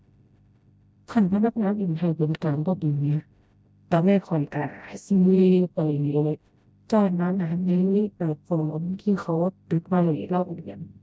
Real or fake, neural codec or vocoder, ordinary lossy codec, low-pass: fake; codec, 16 kHz, 0.5 kbps, FreqCodec, smaller model; none; none